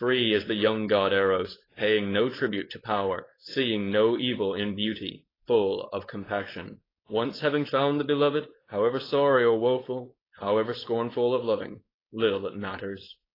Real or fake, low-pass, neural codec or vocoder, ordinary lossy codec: fake; 5.4 kHz; codec, 16 kHz, 4.8 kbps, FACodec; AAC, 24 kbps